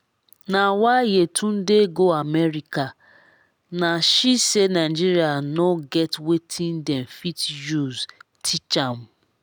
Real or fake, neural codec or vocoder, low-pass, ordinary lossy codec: real; none; none; none